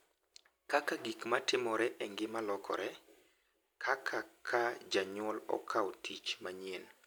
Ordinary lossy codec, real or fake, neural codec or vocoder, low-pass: none; real; none; none